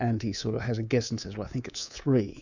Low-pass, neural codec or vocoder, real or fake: 7.2 kHz; codec, 24 kHz, 3.1 kbps, DualCodec; fake